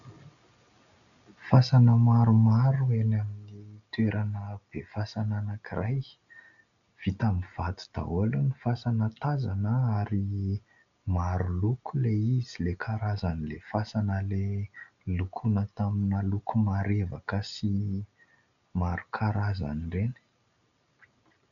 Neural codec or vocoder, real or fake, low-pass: none; real; 7.2 kHz